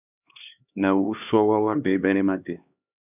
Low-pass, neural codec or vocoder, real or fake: 3.6 kHz; codec, 16 kHz, 2 kbps, X-Codec, HuBERT features, trained on LibriSpeech; fake